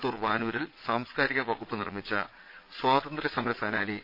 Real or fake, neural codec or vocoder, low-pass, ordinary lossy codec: fake; vocoder, 22.05 kHz, 80 mel bands, Vocos; 5.4 kHz; MP3, 32 kbps